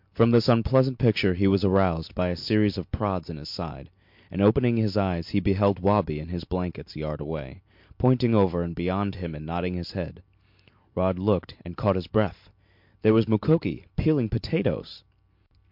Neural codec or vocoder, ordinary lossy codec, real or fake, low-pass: none; MP3, 48 kbps; real; 5.4 kHz